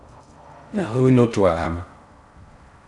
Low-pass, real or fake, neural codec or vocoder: 10.8 kHz; fake; codec, 16 kHz in and 24 kHz out, 0.6 kbps, FocalCodec, streaming, 4096 codes